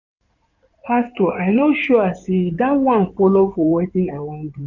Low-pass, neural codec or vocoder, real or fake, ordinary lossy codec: 7.2 kHz; codec, 16 kHz in and 24 kHz out, 2.2 kbps, FireRedTTS-2 codec; fake; none